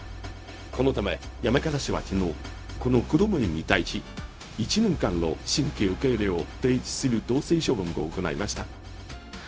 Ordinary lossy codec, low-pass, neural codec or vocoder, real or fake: none; none; codec, 16 kHz, 0.4 kbps, LongCat-Audio-Codec; fake